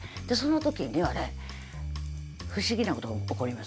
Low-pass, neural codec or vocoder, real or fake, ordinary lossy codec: none; none; real; none